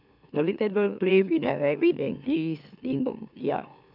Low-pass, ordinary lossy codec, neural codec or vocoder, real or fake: 5.4 kHz; none; autoencoder, 44.1 kHz, a latent of 192 numbers a frame, MeloTTS; fake